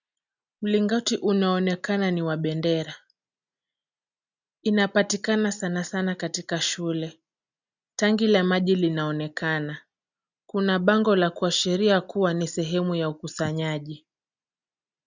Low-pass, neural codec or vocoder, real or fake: 7.2 kHz; none; real